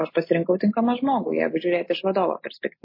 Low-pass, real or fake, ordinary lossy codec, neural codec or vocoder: 5.4 kHz; real; MP3, 24 kbps; none